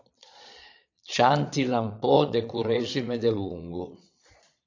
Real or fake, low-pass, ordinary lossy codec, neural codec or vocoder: fake; 7.2 kHz; AAC, 48 kbps; vocoder, 22.05 kHz, 80 mel bands, Vocos